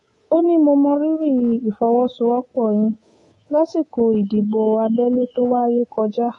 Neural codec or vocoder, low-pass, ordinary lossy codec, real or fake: codec, 24 kHz, 3.1 kbps, DualCodec; 10.8 kHz; AAC, 32 kbps; fake